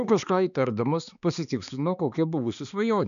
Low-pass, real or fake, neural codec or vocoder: 7.2 kHz; fake; codec, 16 kHz, 2 kbps, X-Codec, HuBERT features, trained on balanced general audio